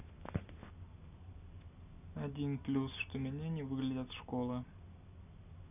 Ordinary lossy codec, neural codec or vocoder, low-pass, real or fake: none; none; 3.6 kHz; real